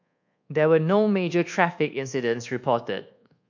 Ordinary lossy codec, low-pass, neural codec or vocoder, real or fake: none; 7.2 kHz; codec, 24 kHz, 1.2 kbps, DualCodec; fake